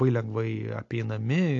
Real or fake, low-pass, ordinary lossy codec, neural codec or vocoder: real; 7.2 kHz; AAC, 48 kbps; none